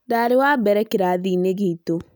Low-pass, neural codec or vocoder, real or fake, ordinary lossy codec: none; none; real; none